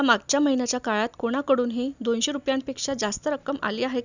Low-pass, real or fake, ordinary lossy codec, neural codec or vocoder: 7.2 kHz; real; none; none